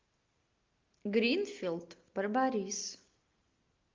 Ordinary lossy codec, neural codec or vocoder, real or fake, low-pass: Opus, 24 kbps; none; real; 7.2 kHz